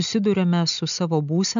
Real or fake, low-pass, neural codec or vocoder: real; 7.2 kHz; none